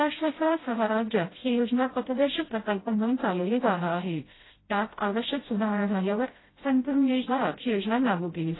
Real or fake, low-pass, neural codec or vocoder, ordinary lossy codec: fake; 7.2 kHz; codec, 16 kHz, 0.5 kbps, FreqCodec, smaller model; AAC, 16 kbps